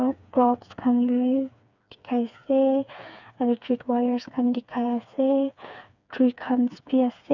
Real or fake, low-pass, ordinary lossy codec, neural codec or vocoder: fake; 7.2 kHz; none; codec, 16 kHz, 4 kbps, FreqCodec, smaller model